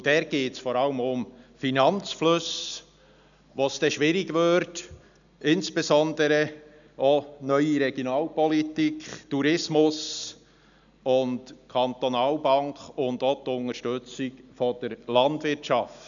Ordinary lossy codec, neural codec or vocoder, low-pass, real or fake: none; none; 7.2 kHz; real